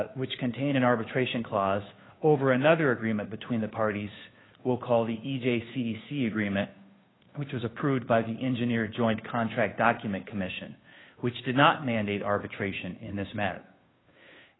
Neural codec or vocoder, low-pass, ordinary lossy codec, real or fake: codec, 16 kHz, 2 kbps, FunCodec, trained on Chinese and English, 25 frames a second; 7.2 kHz; AAC, 16 kbps; fake